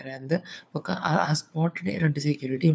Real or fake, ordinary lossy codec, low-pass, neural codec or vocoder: fake; none; none; codec, 16 kHz, 4 kbps, FunCodec, trained on LibriTTS, 50 frames a second